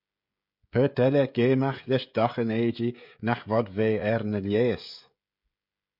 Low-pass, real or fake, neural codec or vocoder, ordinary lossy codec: 5.4 kHz; fake; codec, 16 kHz, 16 kbps, FreqCodec, smaller model; MP3, 48 kbps